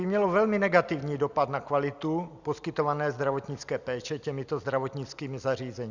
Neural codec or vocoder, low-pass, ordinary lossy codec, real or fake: none; 7.2 kHz; Opus, 64 kbps; real